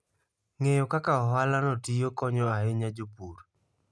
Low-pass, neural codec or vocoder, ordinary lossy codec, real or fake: none; none; none; real